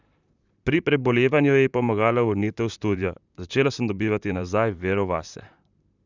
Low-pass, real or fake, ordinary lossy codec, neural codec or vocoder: 7.2 kHz; fake; none; vocoder, 44.1 kHz, 128 mel bands, Pupu-Vocoder